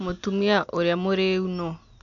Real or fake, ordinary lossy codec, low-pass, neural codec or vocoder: real; none; 7.2 kHz; none